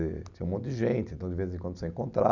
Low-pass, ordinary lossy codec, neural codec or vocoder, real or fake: 7.2 kHz; none; none; real